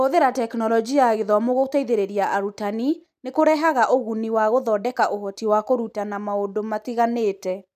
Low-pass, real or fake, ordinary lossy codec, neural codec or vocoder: 14.4 kHz; real; none; none